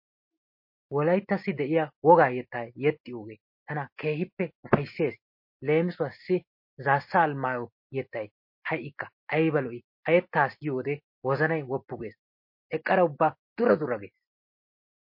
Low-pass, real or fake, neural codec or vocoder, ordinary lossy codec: 5.4 kHz; real; none; MP3, 32 kbps